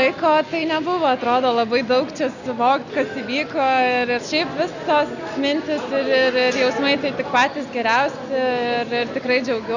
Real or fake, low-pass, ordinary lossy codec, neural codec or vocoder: real; 7.2 kHz; Opus, 64 kbps; none